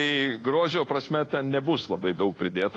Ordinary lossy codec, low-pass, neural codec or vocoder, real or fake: AAC, 32 kbps; 10.8 kHz; codec, 24 kHz, 1.2 kbps, DualCodec; fake